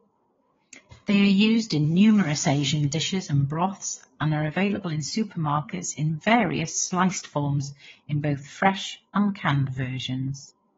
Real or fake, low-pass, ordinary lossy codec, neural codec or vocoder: fake; 7.2 kHz; AAC, 24 kbps; codec, 16 kHz, 4 kbps, FreqCodec, larger model